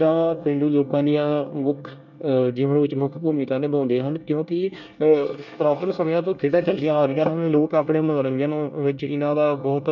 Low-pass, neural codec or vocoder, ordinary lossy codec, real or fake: 7.2 kHz; codec, 24 kHz, 1 kbps, SNAC; none; fake